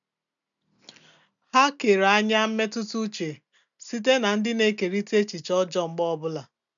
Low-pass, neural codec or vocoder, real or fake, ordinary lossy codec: 7.2 kHz; none; real; none